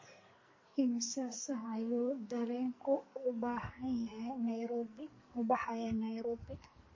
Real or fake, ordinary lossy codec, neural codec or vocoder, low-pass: fake; MP3, 32 kbps; codec, 44.1 kHz, 2.6 kbps, SNAC; 7.2 kHz